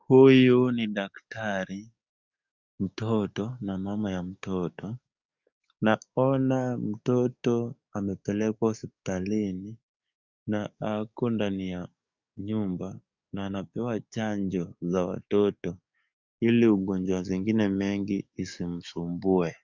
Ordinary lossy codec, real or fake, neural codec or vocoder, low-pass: Opus, 64 kbps; fake; codec, 44.1 kHz, 7.8 kbps, DAC; 7.2 kHz